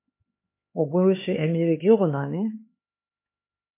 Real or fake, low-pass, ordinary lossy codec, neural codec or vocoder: fake; 3.6 kHz; MP3, 24 kbps; codec, 16 kHz, 4 kbps, X-Codec, HuBERT features, trained on LibriSpeech